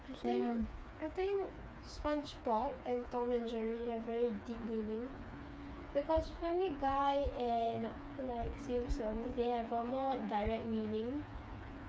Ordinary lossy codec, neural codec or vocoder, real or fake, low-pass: none; codec, 16 kHz, 4 kbps, FreqCodec, smaller model; fake; none